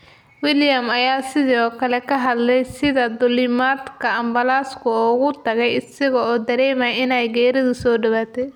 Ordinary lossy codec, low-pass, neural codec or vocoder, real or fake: none; 19.8 kHz; none; real